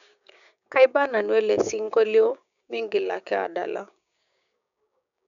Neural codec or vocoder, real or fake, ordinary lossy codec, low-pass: codec, 16 kHz, 6 kbps, DAC; fake; none; 7.2 kHz